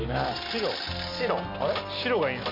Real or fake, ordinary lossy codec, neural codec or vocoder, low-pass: real; MP3, 32 kbps; none; 5.4 kHz